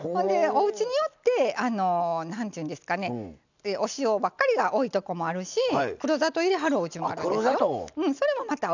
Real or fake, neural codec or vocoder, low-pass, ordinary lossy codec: real; none; 7.2 kHz; none